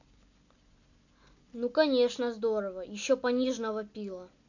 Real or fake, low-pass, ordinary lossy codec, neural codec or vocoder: real; 7.2 kHz; none; none